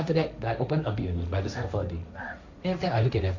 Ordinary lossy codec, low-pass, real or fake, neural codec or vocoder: none; 7.2 kHz; fake; codec, 16 kHz, 1.1 kbps, Voila-Tokenizer